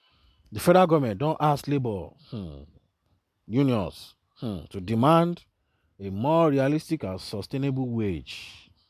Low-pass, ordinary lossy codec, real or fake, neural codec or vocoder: 14.4 kHz; none; real; none